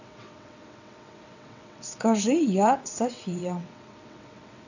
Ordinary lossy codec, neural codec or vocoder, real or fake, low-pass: none; vocoder, 22.05 kHz, 80 mel bands, WaveNeXt; fake; 7.2 kHz